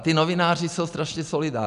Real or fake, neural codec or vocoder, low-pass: real; none; 10.8 kHz